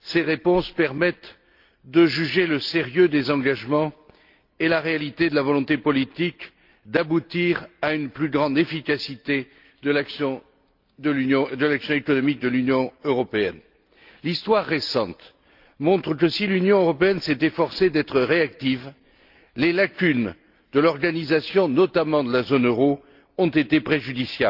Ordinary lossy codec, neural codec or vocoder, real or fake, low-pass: Opus, 24 kbps; none; real; 5.4 kHz